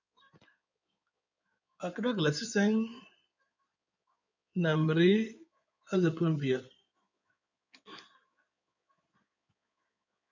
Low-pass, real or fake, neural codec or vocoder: 7.2 kHz; fake; codec, 16 kHz in and 24 kHz out, 2.2 kbps, FireRedTTS-2 codec